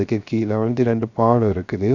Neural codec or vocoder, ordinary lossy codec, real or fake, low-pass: codec, 16 kHz, 0.3 kbps, FocalCodec; none; fake; 7.2 kHz